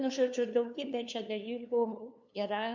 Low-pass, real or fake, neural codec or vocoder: 7.2 kHz; fake; codec, 16 kHz, 2 kbps, FunCodec, trained on LibriTTS, 25 frames a second